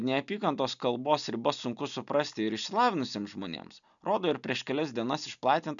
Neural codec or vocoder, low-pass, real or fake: none; 7.2 kHz; real